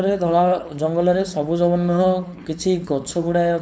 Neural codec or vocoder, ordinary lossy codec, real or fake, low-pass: codec, 16 kHz, 4.8 kbps, FACodec; none; fake; none